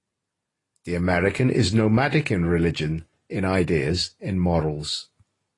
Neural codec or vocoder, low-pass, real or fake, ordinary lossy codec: none; 10.8 kHz; real; AAC, 32 kbps